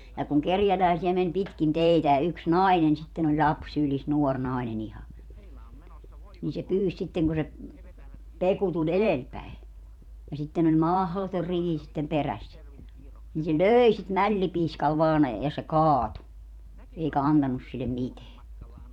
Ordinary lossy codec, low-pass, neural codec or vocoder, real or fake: none; 19.8 kHz; vocoder, 44.1 kHz, 128 mel bands every 512 samples, BigVGAN v2; fake